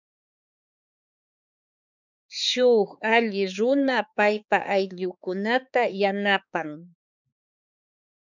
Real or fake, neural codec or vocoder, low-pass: fake; codec, 16 kHz, 4 kbps, X-Codec, HuBERT features, trained on balanced general audio; 7.2 kHz